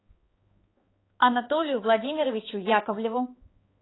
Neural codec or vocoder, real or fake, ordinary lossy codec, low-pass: codec, 16 kHz, 4 kbps, X-Codec, HuBERT features, trained on balanced general audio; fake; AAC, 16 kbps; 7.2 kHz